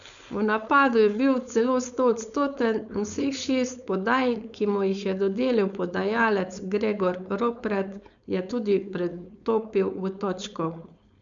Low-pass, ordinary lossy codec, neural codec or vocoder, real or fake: 7.2 kHz; MP3, 96 kbps; codec, 16 kHz, 4.8 kbps, FACodec; fake